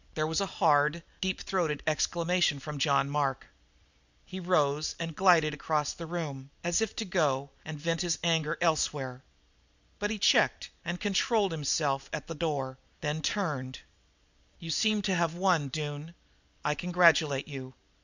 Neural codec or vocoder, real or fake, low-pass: none; real; 7.2 kHz